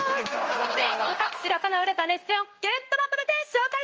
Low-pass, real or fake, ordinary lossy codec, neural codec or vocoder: 7.2 kHz; fake; Opus, 24 kbps; codec, 16 kHz in and 24 kHz out, 1 kbps, XY-Tokenizer